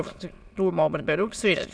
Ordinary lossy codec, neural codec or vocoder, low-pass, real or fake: none; autoencoder, 22.05 kHz, a latent of 192 numbers a frame, VITS, trained on many speakers; none; fake